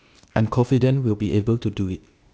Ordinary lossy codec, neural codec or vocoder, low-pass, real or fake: none; codec, 16 kHz, 0.8 kbps, ZipCodec; none; fake